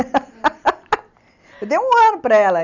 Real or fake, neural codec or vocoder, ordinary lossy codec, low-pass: real; none; none; 7.2 kHz